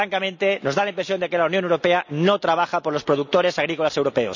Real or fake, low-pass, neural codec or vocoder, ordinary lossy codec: real; 7.2 kHz; none; none